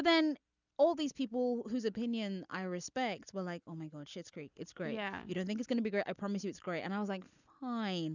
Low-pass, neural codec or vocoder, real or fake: 7.2 kHz; none; real